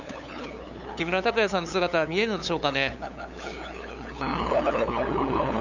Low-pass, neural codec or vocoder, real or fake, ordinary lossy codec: 7.2 kHz; codec, 16 kHz, 8 kbps, FunCodec, trained on LibriTTS, 25 frames a second; fake; none